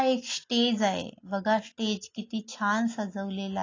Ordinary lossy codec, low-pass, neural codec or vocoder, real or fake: AAC, 32 kbps; 7.2 kHz; none; real